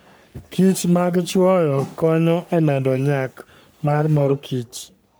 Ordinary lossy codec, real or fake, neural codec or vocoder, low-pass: none; fake; codec, 44.1 kHz, 3.4 kbps, Pupu-Codec; none